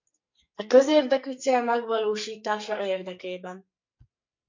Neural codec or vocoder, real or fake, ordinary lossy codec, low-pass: codec, 44.1 kHz, 2.6 kbps, SNAC; fake; MP3, 48 kbps; 7.2 kHz